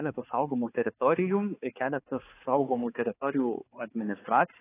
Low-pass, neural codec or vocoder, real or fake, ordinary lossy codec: 3.6 kHz; codec, 16 kHz, 4 kbps, X-Codec, HuBERT features, trained on balanced general audio; fake; AAC, 16 kbps